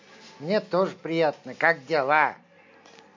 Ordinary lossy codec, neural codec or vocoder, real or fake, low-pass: MP3, 48 kbps; none; real; 7.2 kHz